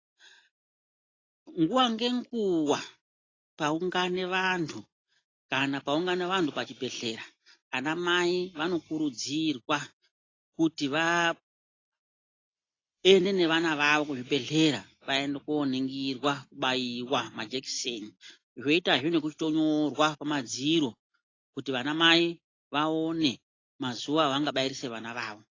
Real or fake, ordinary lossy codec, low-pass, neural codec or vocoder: real; AAC, 32 kbps; 7.2 kHz; none